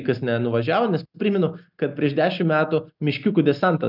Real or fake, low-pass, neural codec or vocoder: real; 5.4 kHz; none